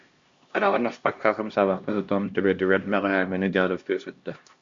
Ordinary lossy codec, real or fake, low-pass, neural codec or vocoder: Opus, 64 kbps; fake; 7.2 kHz; codec, 16 kHz, 1 kbps, X-Codec, HuBERT features, trained on LibriSpeech